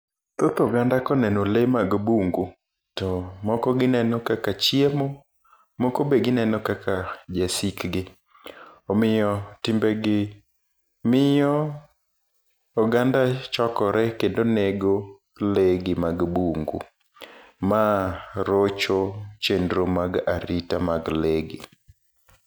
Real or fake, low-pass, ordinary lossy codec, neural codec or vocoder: real; none; none; none